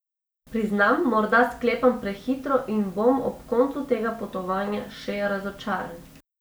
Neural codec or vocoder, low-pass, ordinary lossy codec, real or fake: none; none; none; real